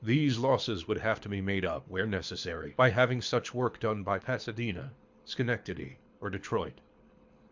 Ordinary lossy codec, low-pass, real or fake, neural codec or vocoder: MP3, 64 kbps; 7.2 kHz; fake; codec, 24 kHz, 6 kbps, HILCodec